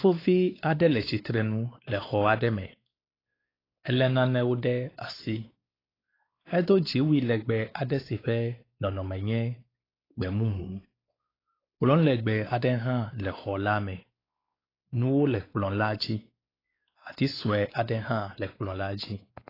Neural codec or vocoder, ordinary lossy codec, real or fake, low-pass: codec, 16 kHz, 4 kbps, X-Codec, WavLM features, trained on Multilingual LibriSpeech; AAC, 24 kbps; fake; 5.4 kHz